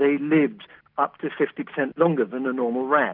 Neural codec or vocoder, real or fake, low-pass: none; real; 5.4 kHz